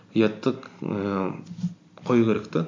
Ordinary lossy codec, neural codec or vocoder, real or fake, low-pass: MP3, 48 kbps; none; real; 7.2 kHz